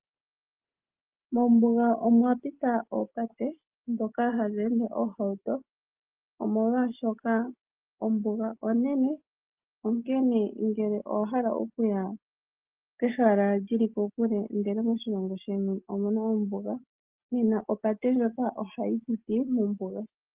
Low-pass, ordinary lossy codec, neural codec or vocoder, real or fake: 3.6 kHz; Opus, 32 kbps; none; real